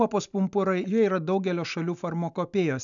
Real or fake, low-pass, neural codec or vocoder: real; 7.2 kHz; none